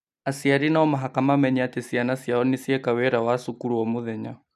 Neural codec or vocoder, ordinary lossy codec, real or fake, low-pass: none; MP3, 96 kbps; real; 14.4 kHz